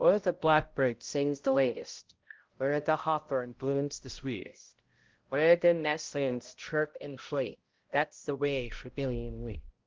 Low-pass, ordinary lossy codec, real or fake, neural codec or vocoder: 7.2 kHz; Opus, 16 kbps; fake; codec, 16 kHz, 0.5 kbps, X-Codec, HuBERT features, trained on balanced general audio